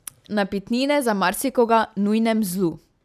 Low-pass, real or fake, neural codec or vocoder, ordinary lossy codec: 14.4 kHz; real; none; none